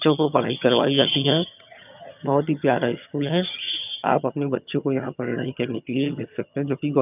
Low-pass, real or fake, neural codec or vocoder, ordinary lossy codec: 3.6 kHz; fake; vocoder, 22.05 kHz, 80 mel bands, HiFi-GAN; none